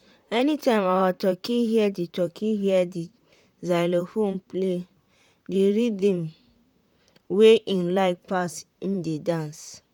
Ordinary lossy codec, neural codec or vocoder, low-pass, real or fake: none; vocoder, 44.1 kHz, 128 mel bands, Pupu-Vocoder; 19.8 kHz; fake